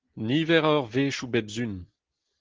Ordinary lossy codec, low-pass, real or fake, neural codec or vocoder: Opus, 16 kbps; 7.2 kHz; real; none